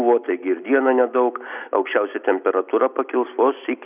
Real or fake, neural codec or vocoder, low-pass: real; none; 3.6 kHz